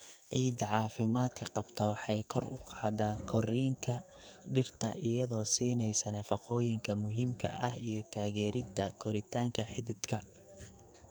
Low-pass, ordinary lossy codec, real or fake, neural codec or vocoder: none; none; fake; codec, 44.1 kHz, 2.6 kbps, SNAC